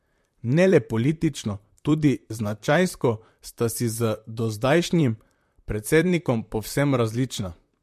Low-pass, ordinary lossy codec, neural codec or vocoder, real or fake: 14.4 kHz; MP3, 64 kbps; vocoder, 44.1 kHz, 128 mel bands, Pupu-Vocoder; fake